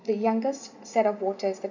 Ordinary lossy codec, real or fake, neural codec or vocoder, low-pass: none; real; none; 7.2 kHz